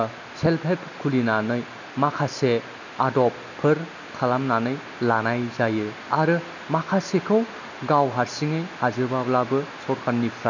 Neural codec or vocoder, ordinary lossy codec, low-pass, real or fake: none; none; 7.2 kHz; real